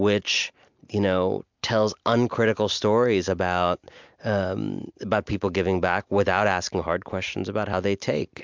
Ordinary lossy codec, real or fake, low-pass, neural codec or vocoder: MP3, 64 kbps; real; 7.2 kHz; none